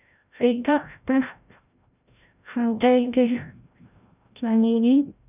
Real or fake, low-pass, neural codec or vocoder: fake; 3.6 kHz; codec, 16 kHz, 0.5 kbps, FreqCodec, larger model